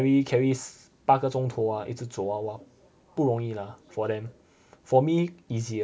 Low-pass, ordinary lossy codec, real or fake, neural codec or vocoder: none; none; real; none